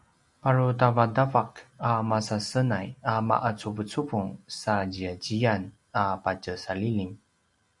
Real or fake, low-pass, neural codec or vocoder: real; 10.8 kHz; none